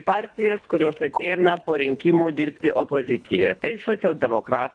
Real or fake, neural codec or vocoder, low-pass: fake; codec, 24 kHz, 1.5 kbps, HILCodec; 9.9 kHz